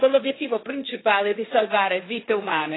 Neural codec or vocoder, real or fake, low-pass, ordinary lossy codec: codec, 16 kHz, 1.1 kbps, Voila-Tokenizer; fake; 7.2 kHz; AAC, 16 kbps